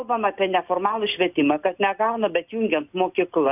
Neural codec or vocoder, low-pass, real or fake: none; 3.6 kHz; real